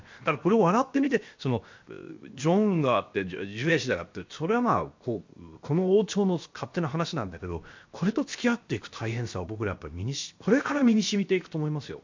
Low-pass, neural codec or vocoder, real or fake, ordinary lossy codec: 7.2 kHz; codec, 16 kHz, 0.7 kbps, FocalCodec; fake; MP3, 48 kbps